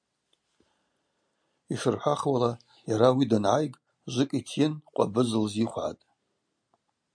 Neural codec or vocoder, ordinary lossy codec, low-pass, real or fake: none; MP3, 64 kbps; 9.9 kHz; real